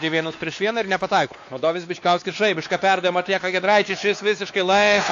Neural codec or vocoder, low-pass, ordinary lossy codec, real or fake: codec, 16 kHz, 2 kbps, X-Codec, WavLM features, trained on Multilingual LibriSpeech; 7.2 kHz; AAC, 48 kbps; fake